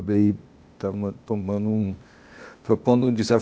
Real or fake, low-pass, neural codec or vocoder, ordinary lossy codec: fake; none; codec, 16 kHz, 0.8 kbps, ZipCodec; none